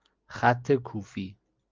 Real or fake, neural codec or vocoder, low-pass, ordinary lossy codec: real; none; 7.2 kHz; Opus, 32 kbps